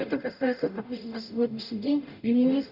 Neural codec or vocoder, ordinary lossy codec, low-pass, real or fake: codec, 44.1 kHz, 0.9 kbps, DAC; none; 5.4 kHz; fake